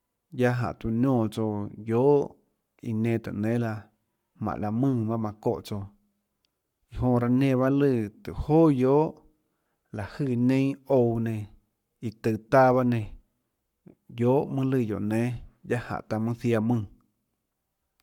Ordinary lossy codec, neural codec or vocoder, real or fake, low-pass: MP3, 96 kbps; codec, 44.1 kHz, 7.8 kbps, Pupu-Codec; fake; 19.8 kHz